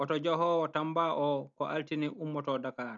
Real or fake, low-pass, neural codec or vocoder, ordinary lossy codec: real; 7.2 kHz; none; none